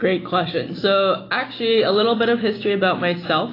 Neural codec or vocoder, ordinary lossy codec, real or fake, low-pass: none; AAC, 24 kbps; real; 5.4 kHz